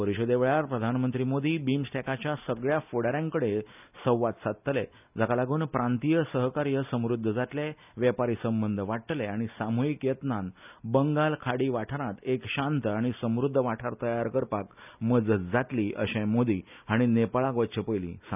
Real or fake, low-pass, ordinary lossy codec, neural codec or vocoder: real; 3.6 kHz; none; none